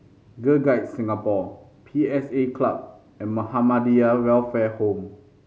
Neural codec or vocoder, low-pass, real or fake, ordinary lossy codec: none; none; real; none